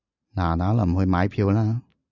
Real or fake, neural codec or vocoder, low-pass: real; none; 7.2 kHz